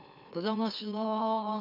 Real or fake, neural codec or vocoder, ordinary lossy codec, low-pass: fake; autoencoder, 44.1 kHz, a latent of 192 numbers a frame, MeloTTS; none; 5.4 kHz